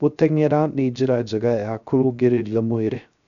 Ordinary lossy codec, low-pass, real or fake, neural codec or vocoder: none; 7.2 kHz; fake; codec, 16 kHz, 0.3 kbps, FocalCodec